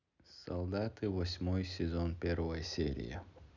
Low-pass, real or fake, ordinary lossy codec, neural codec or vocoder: 7.2 kHz; real; none; none